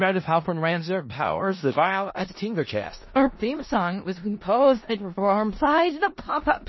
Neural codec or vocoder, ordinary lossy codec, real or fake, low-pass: codec, 16 kHz in and 24 kHz out, 0.4 kbps, LongCat-Audio-Codec, four codebook decoder; MP3, 24 kbps; fake; 7.2 kHz